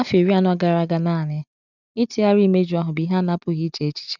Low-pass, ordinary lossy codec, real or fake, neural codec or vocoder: 7.2 kHz; none; real; none